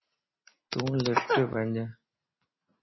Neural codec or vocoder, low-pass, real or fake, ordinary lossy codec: none; 7.2 kHz; real; MP3, 24 kbps